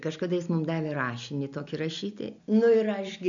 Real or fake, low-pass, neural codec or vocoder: real; 7.2 kHz; none